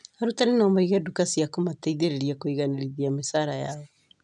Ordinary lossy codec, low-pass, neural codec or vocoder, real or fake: none; 10.8 kHz; none; real